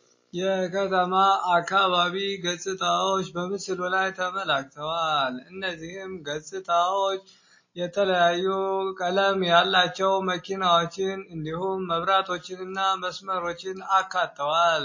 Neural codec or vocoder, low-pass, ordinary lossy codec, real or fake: none; 7.2 kHz; MP3, 32 kbps; real